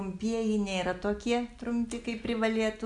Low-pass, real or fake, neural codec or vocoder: 10.8 kHz; real; none